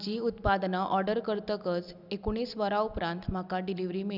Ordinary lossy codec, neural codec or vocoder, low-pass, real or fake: none; none; 5.4 kHz; real